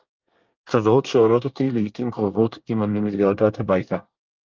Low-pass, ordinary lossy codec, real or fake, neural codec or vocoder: 7.2 kHz; Opus, 24 kbps; fake; codec, 24 kHz, 1 kbps, SNAC